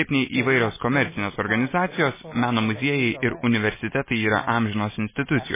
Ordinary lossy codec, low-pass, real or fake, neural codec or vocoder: MP3, 16 kbps; 3.6 kHz; real; none